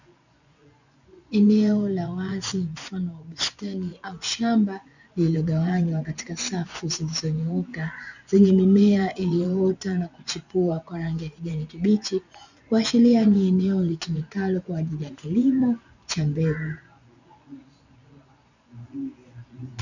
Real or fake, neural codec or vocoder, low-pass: fake; vocoder, 24 kHz, 100 mel bands, Vocos; 7.2 kHz